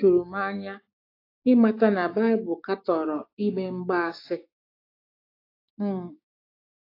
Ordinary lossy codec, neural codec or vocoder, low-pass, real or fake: AAC, 32 kbps; codec, 16 kHz, 6 kbps, DAC; 5.4 kHz; fake